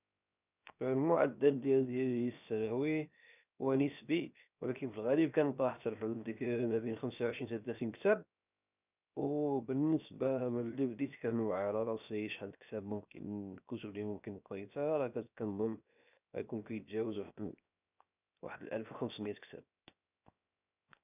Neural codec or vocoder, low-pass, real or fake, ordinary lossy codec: codec, 16 kHz, 0.7 kbps, FocalCodec; 3.6 kHz; fake; none